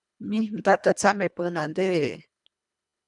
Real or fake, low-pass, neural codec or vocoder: fake; 10.8 kHz; codec, 24 kHz, 1.5 kbps, HILCodec